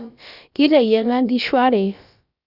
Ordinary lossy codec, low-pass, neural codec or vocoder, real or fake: Opus, 64 kbps; 5.4 kHz; codec, 16 kHz, about 1 kbps, DyCAST, with the encoder's durations; fake